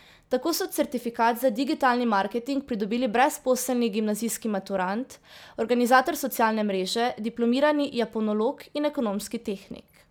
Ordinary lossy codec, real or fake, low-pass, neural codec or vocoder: none; real; none; none